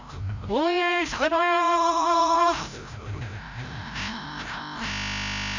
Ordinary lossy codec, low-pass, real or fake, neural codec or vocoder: Opus, 64 kbps; 7.2 kHz; fake; codec, 16 kHz, 0.5 kbps, FreqCodec, larger model